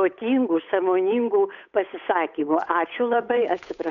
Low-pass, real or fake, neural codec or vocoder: 7.2 kHz; fake; codec, 16 kHz, 8 kbps, FunCodec, trained on Chinese and English, 25 frames a second